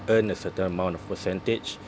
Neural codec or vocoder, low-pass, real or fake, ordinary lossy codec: none; none; real; none